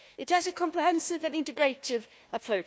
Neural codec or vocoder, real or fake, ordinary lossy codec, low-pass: codec, 16 kHz, 1 kbps, FunCodec, trained on Chinese and English, 50 frames a second; fake; none; none